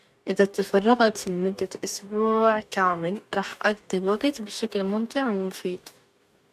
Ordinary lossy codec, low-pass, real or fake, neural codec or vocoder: none; 14.4 kHz; fake; codec, 44.1 kHz, 2.6 kbps, DAC